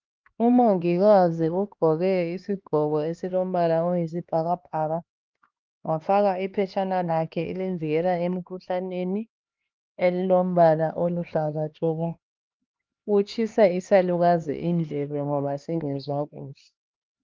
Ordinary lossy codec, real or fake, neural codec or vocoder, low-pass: Opus, 24 kbps; fake; codec, 16 kHz, 2 kbps, X-Codec, HuBERT features, trained on LibriSpeech; 7.2 kHz